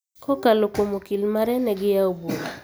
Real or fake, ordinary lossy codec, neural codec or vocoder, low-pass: real; none; none; none